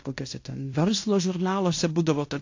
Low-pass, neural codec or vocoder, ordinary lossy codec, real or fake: 7.2 kHz; codec, 16 kHz in and 24 kHz out, 0.9 kbps, LongCat-Audio-Codec, fine tuned four codebook decoder; AAC, 48 kbps; fake